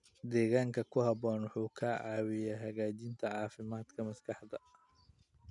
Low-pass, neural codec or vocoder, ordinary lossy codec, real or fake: 10.8 kHz; none; none; real